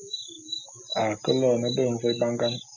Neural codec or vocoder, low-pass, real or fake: none; 7.2 kHz; real